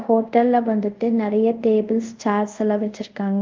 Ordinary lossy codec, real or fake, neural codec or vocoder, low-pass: Opus, 32 kbps; fake; codec, 24 kHz, 0.5 kbps, DualCodec; 7.2 kHz